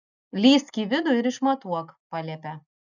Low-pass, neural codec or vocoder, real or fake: 7.2 kHz; none; real